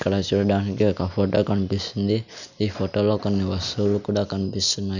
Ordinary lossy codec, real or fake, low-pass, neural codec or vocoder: none; real; 7.2 kHz; none